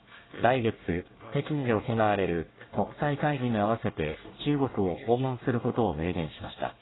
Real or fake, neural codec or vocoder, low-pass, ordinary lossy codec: fake; codec, 24 kHz, 1 kbps, SNAC; 7.2 kHz; AAC, 16 kbps